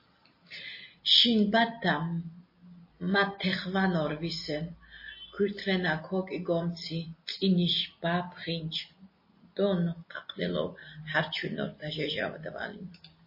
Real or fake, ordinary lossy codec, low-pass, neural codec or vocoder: real; MP3, 24 kbps; 5.4 kHz; none